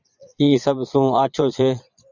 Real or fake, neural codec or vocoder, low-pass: fake; vocoder, 22.05 kHz, 80 mel bands, Vocos; 7.2 kHz